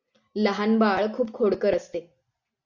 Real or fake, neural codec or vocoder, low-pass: real; none; 7.2 kHz